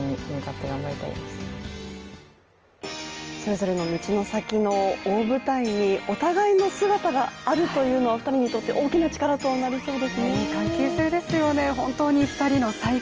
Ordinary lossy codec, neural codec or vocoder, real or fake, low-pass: Opus, 24 kbps; none; real; 7.2 kHz